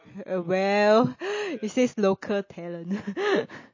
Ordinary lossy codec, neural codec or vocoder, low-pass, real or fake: MP3, 32 kbps; none; 7.2 kHz; real